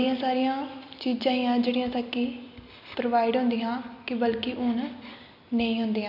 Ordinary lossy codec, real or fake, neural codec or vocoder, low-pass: none; real; none; 5.4 kHz